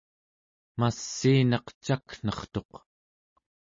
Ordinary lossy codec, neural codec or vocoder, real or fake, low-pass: MP3, 32 kbps; none; real; 7.2 kHz